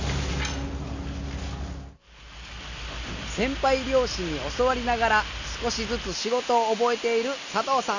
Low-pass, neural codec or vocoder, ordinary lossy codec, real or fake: 7.2 kHz; none; none; real